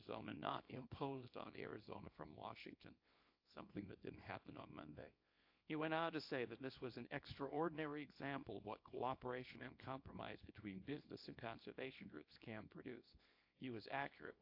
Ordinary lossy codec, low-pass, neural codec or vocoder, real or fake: AAC, 48 kbps; 5.4 kHz; codec, 24 kHz, 0.9 kbps, WavTokenizer, small release; fake